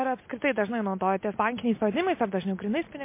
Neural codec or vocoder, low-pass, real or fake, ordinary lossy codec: none; 3.6 kHz; real; MP3, 24 kbps